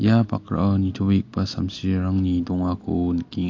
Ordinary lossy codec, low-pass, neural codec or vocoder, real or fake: AAC, 48 kbps; 7.2 kHz; none; real